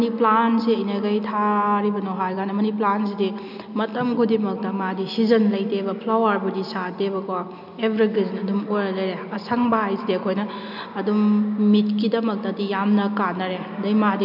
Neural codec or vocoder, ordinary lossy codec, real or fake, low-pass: none; none; real; 5.4 kHz